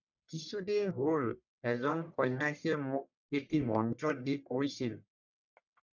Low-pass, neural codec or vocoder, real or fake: 7.2 kHz; codec, 44.1 kHz, 1.7 kbps, Pupu-Codec; fake